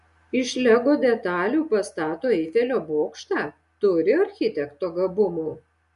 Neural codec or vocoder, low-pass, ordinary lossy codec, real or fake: none; 10.8 kHz; MP3, 64 kbps; real